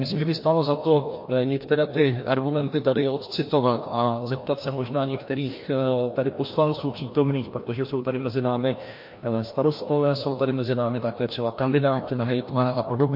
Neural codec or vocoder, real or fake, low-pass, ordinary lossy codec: codec, 16 kHz, 1 kbps, FreqCodec, larger model; fake; 5.4 kHz; MP3, 32 kbps